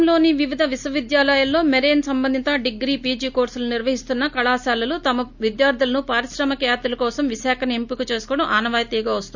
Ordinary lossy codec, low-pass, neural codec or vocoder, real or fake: none; 7.2 kHz; none; real